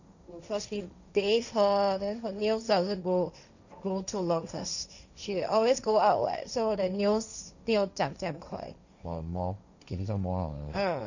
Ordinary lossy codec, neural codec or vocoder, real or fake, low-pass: none; codec, 16 kHz, 1.1 kbps, Voila-Tokenizer; fake; 7.2 kHz